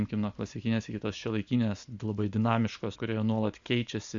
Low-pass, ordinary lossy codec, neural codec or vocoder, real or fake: 7.2 kHz; Opus, 64 kbps; none; real